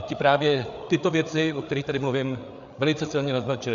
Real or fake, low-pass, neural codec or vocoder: fake; 7.2 kHz; codec, 16 kHz, 8 kbps, FreqCodec, larger model